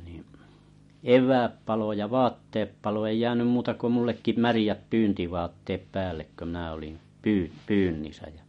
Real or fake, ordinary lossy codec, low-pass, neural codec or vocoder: real; MP3, 48 kbps; 10.8 kHz; none